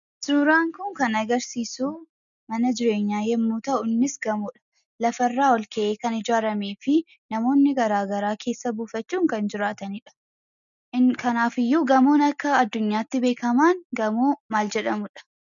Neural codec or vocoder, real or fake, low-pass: none; real; 7.2 kHz